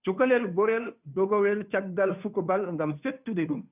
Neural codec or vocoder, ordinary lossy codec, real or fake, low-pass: codec, 16 kHz, 2 kbps, FunCodec, trained on Chinese and English, 25 frames a second; none; fake; 3.6 kHz